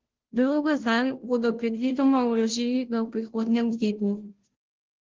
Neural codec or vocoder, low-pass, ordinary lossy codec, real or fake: codec, 16 kHz, 0.5 kbps, FunCodec, trained on Chinese and English, 25 frames a second; 7.2 kHz; Opus, 16 kbps; fake